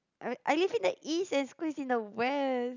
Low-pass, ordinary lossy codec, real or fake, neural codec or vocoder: 7.2 kHz; none; real; none